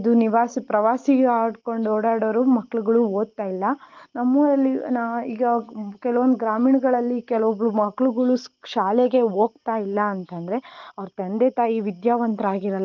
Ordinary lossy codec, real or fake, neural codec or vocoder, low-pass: Opus, 32 kbps; real; none; 7.2 kHz